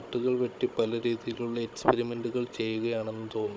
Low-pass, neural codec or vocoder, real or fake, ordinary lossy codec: none; codec, 16 kHz, 16 kbps, FunCodec, trained on Chinese and English, 50 frames a second; fake; none